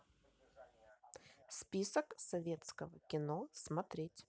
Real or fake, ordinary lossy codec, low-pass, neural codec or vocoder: real; none; none; none